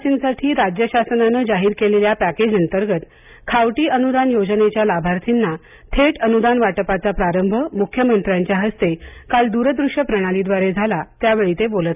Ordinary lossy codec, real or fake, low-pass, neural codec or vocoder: none; real; 3.6 kHz; none